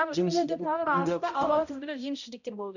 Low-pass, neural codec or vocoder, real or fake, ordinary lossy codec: 7.2 kHz; codec, 16 kHz, 0.5 kbps, X-Codec, HuBERT features, trained on balanced general audio; fake; none